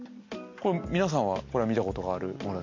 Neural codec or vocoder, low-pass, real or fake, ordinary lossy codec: none; 7.2 kHz; real; MP3, 48 kbps